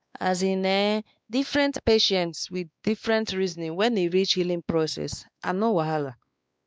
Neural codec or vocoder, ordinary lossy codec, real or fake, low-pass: codec, 16 kHz, 2 kbps, X-Codec, WavLM features, trained on Multilingual LibriSpeech; none; fake; none